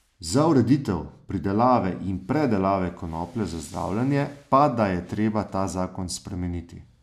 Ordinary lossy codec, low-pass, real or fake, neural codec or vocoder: none; 14.4 kHz; real; none